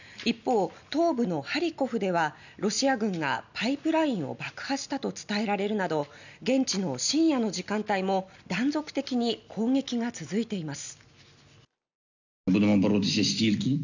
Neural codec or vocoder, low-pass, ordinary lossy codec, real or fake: none; 7.2 kHz; none; real